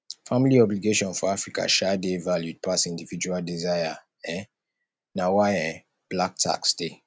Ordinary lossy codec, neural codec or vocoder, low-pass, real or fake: none; none; none; real